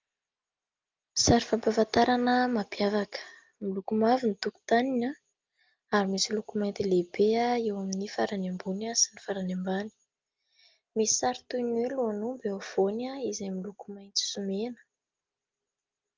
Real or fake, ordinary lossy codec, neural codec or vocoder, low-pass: real; Opus, 32 kbps; none; 7.2 kHz